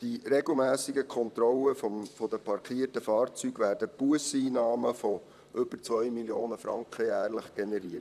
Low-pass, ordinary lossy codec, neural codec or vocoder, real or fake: 14.4 kHz; none; vocoder, 44.1 kHz, 128 mel bands, Pupu-Vocoder; fake